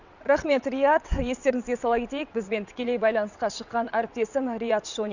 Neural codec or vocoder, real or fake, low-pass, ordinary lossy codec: vocoder, 22.05 kHz, 80 mel bands, WaveNeXt; fake; 7.2 kHz; none